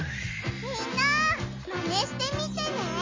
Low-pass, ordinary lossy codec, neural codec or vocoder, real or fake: 7.2 kHz; MP3, 32 kbps; none; real